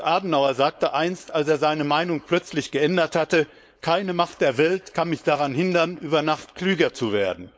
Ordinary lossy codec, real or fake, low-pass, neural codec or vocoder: none; fake; none; codec, 16 kHz, 4.8 kbps, FACodec